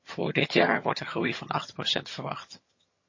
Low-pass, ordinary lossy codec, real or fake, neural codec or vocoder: 7.2 kHz; MP3, 32 kbps; fake; vocoder, 22.05 kHz, 80 mel bands, HiFi-GAN